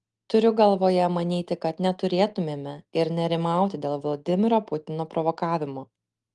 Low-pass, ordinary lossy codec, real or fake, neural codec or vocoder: 10.8 kHz; Opus, 24 kbps; real; none